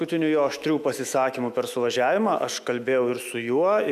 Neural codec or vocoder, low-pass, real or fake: autoencoder, 48 kHz, 128 numbers a frame, DAC-VAE, trained on Japanese speech; 14.4 kHz; fake